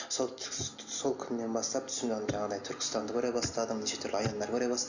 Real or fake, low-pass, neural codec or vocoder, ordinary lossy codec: real; 7.2 kHz; none; none